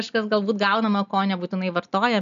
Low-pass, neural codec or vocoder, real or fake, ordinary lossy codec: 7.2 kHz; none; real; AAC, 96 kbps